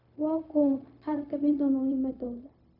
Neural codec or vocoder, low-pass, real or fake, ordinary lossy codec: codec, 16 kHz, 0.4 kbps, LongCat-Audio-Codec; 5.4 kHz; fake; none